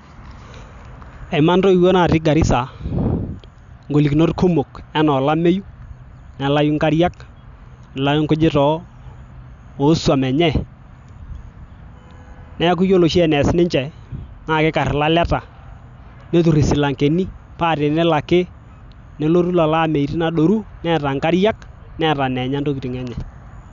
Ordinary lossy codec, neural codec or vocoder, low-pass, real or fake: none; none; 7.2 kHz; real